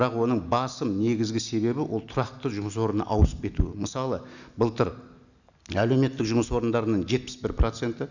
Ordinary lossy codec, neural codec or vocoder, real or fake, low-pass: none; none; real; 7.2 kHz